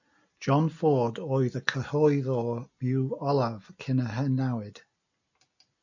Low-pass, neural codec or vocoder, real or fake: 7.2 kHz; none; real